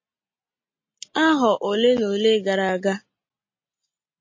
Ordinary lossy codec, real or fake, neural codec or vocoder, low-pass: MP3, 32 kbps; real; none; 7.2 kHz